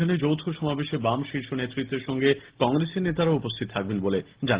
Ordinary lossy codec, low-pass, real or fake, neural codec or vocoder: Opus, 16 kbps; 3.6 kHz; real; none